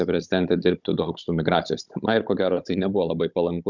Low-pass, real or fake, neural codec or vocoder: 7.2 kHz; fake; codec, 16 kHz, 16 kbps, FunCodec, trained on Chinese and English, 50 frames a second